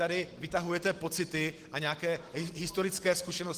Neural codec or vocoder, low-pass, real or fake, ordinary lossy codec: none; 14.4 kHz; real; Opus, 16 kbps